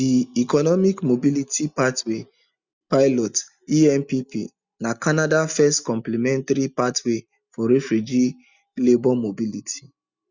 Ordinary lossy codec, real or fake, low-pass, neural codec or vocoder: Opus, 64 kbps; real; 7.2 kHz; none